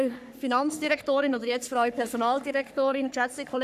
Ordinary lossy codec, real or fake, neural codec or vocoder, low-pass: none; fake; codec, 44.1 kHz, 3.4 kbps, Pupu-Codec; 14.4 kHz